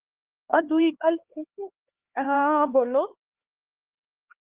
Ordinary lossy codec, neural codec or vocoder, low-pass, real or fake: Opus, 16 kbps; codec, 16 kHz, 2 kbps, X-Codec, HuBERT features, trained on LibriSpeech; 3.6 kHz; fake